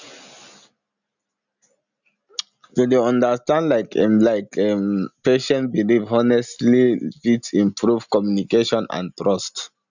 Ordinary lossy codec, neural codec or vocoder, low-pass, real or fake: none; none; 7.2 kHz; real